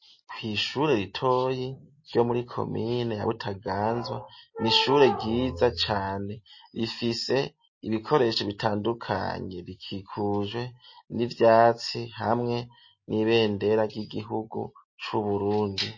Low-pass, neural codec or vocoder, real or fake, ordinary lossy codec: 7.2 kHz; none; real; MP3, 32 kbps